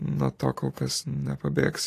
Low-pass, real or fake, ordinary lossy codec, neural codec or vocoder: 14.4 kHz; real; AAC, 48 kbps; none